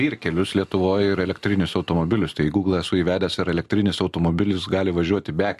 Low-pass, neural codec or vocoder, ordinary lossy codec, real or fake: 14.4 kHz; none; MP3, 64 kbps; real